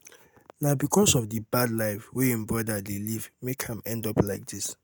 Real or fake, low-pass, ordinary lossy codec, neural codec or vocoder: real; none; none; none